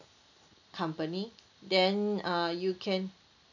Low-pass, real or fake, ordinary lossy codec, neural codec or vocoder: 7.2 kHz; real; none; none